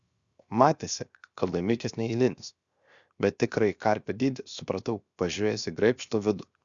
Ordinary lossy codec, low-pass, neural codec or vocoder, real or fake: Opus, 64 kbps; 7.2 kHz; codec, 16 kHz, 0.7 kbps, FocalCodec; fake